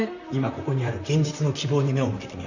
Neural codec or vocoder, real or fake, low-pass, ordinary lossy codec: vocoder, 44.1 kHz, 128 mel bands, Pupu-Vocoder; fake; 7.2 kHz; none